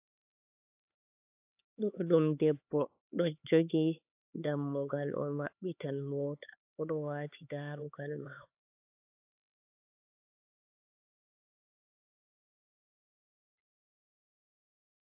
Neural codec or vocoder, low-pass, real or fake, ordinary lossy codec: codec, 16 kHz, 4 kbps, X-Codec, HuBERT features, trained on LibriSpeech; 3.6 kHz; fake; AAC, 32 kbps